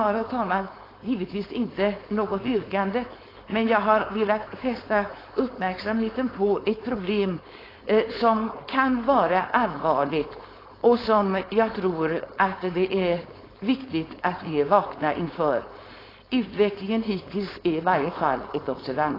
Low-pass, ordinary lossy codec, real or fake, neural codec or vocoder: 5.4 kHz; AAC, 24 kbps; fake; codec, 16 kHz, 4.8 kbps, FACodec